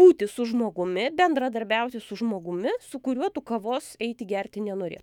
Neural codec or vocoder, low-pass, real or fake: autoencoder, 48 kHz, 128 numbers a frame, DAC-VAE, trained on Japanese speech; 19.8 kHz; fake